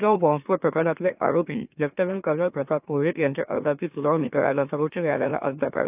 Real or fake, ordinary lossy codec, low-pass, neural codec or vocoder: fake; AAC, 32 kbps; 3.6 kHz; autoencoder, 44.1 kHz, a latent of 192 numbers a frame, MeloTTS